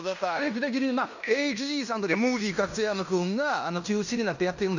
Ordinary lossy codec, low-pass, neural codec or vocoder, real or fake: none; 7.2 kHz; codec, 16 kHz in and 24 kHz out, 0.9 kbps, LongCat-Audio-Codec, fine tuned four codebook decoder; fake